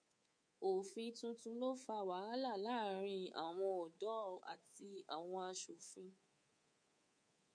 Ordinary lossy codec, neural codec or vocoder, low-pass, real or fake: MP3, 48 kbps; codec, 24 kHz, 3.1 kbps, DualCodec; 9.9 kHz; fake